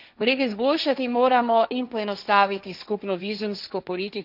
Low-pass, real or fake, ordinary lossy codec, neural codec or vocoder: 5.4 kHz; fake; none; codec, 16 kHz, 1.1 kbps, Voila-Tokenizer